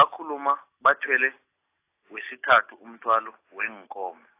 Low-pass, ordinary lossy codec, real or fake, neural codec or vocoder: 3.6 kHz; AAC, 24 kbps; real; none